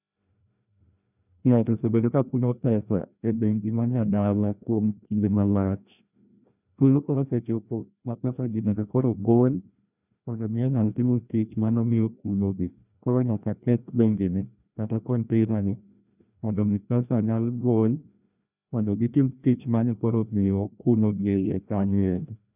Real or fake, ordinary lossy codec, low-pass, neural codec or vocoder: fake; none; 3.6 kHz; codec, 16 kHz, 1 kbps, FreqCodec, larger model